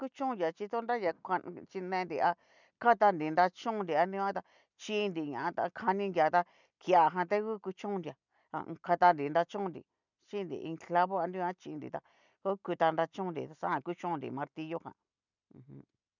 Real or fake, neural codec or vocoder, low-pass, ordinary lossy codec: real; none; 7.2 kHz; none